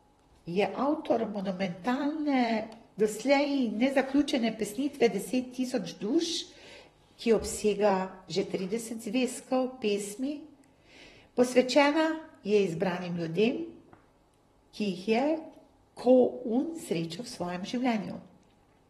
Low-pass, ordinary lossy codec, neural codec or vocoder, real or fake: 19.8 kHz; AAC, 32 kbps; vocoder, 44.1 kHz, 128 mel bands, Pupu-Vocoder; fake